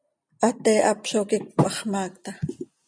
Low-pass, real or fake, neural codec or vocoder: 9.9 kHz; real; none